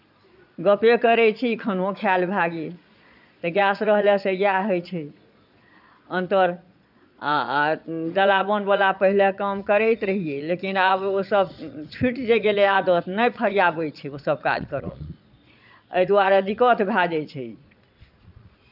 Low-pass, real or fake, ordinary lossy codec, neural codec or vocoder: 5.4 kHz; fake; none; vocoder, 22.05 kHz, 80 mel bands, Vocos